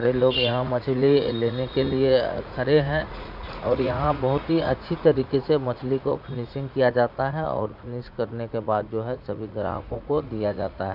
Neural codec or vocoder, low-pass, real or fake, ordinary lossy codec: vocoder, 44.1 kHz, 80 mel bands, Vocos; 5.4 kHz; fake; none